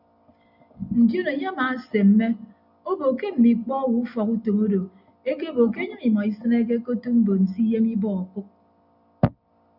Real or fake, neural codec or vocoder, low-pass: real; none; 5.4 kHz